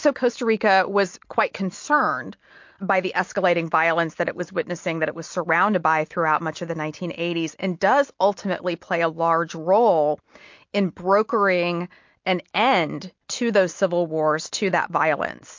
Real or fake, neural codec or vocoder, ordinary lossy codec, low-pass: real; none; MP3, 48 kbps; 7.2 kHz